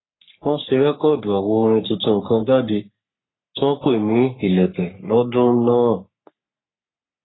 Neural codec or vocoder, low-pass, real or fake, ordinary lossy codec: codec, 44.1 kHz, 3.4 kbps, Pupu-Codec; 7.2 kHz; fake; AAC, 16 kbps